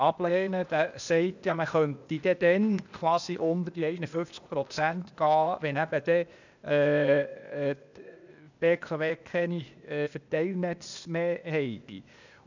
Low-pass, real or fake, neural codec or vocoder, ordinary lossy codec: 7.2 kHz; fake; codec, 16 kHz, 0.8 kbps, ZipCodec; none